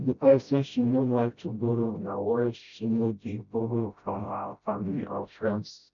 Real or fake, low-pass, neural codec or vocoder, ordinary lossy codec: fake; 7.2 kHz; codec, 16 kHz, 0.5 kbps, FreqCodec, smaller model; none